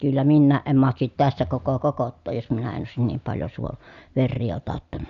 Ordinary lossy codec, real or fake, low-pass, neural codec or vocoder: none; real; 7.2 kHz; none